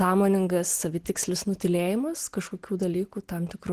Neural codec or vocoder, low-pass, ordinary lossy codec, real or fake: none; 14.4 kHz; Opus, 16 kbps; real